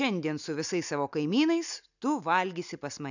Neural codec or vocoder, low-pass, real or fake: none; 7.2 kHz; real